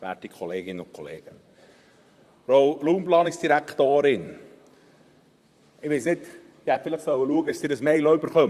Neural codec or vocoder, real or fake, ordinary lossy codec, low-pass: vocoder, 44.1 kHz, 128 mel bands, Pupu-Vocoder; fake; Opus, 64 kbps; 14.4 kHz